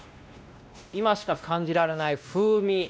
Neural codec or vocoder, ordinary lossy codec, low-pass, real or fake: codec, 16 kHz, 1 kbps, X-Codec, WavLM features, trained on Multilingual LibriSpeech; none; none; fake